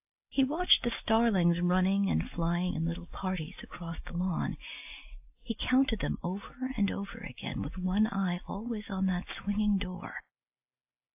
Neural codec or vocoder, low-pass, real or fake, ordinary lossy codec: none; 3.6 kHz; real; AAC, 32 kbps